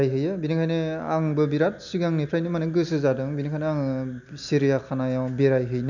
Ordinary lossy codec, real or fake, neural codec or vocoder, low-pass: none; real; none; 7.2 kHz